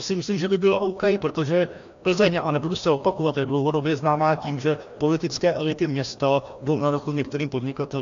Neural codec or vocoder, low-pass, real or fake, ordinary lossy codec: codec, 16 kHz, 1 kbps, FreqCodec, larger model; 7.2 kHz; fake; AAC, 64 kbps